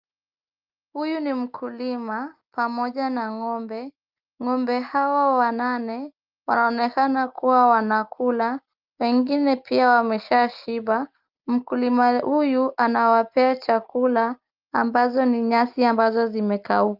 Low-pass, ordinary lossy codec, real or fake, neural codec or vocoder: 5.4 kHz; Opus, 24 kbps; real; none